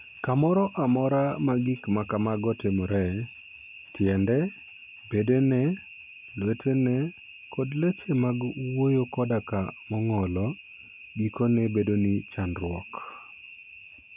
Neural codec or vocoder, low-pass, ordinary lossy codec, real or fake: none; 3.6 kHz; none; real